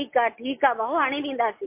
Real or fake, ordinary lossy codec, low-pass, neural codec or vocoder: real; MP3, 24 kbps; 3.6 kHz; none